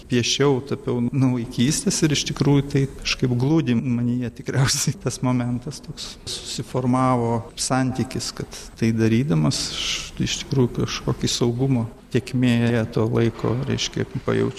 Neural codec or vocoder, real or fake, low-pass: none; real; 14.4 kHz